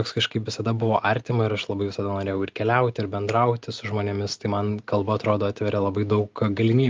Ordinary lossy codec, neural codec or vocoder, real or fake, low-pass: Opus, 32 kbps; none; real; 7.2 kHz